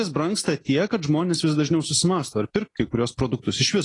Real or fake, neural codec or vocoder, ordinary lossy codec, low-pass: real; none; AAC, 32 kbps; 10.8 kHz